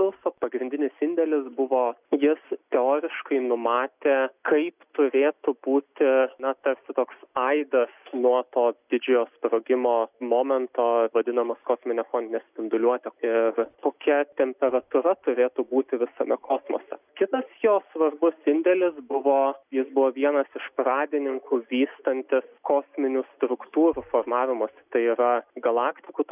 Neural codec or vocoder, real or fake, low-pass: none; real; 3.6 kHz